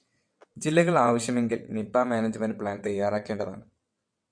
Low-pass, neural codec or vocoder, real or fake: 9.9 kHz; vocoder, 22.05 kHz, 80 mel bands, WaveNeXt; fake